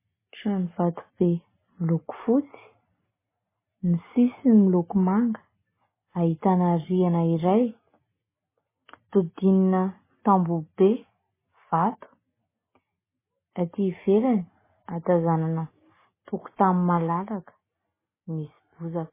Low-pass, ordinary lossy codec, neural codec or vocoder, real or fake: 3.6 kHz; MP3, 16 kbps; none; real